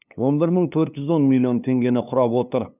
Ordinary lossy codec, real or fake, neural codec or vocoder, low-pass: none; fake; codec, 16 kHz, 2 kbps, FunCodec, trained on LibriTTS, 25 frames a second; 3.6 kHz